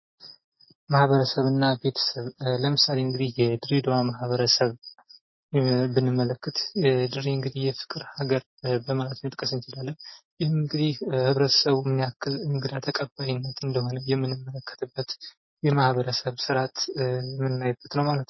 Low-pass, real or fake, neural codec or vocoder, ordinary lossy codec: 7.2 kHz; real; none; MP3, 24 kbps